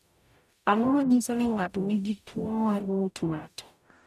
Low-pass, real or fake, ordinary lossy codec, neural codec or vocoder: 14.4 kHz; fake; none; codec, 44.1 kHz, 0.9 kbps, DAC